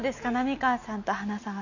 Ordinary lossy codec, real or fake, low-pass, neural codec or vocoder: none; real; 7.2 kHz; none